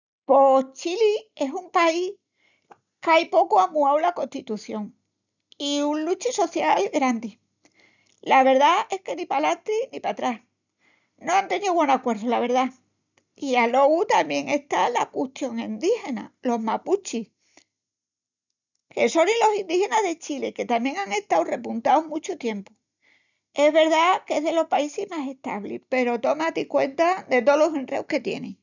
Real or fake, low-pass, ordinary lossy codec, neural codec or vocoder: real; 7.2 kHz; none; none